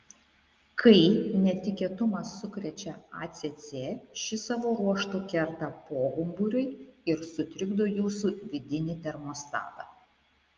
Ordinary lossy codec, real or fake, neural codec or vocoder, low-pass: Opus, 24 kbps; real; none; 7.2 kHz